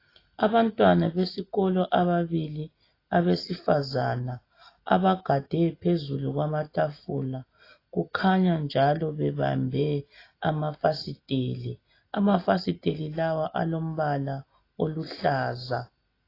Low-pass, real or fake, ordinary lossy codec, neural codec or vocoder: 5.4 kHz; real; AAC, 24 kbps; none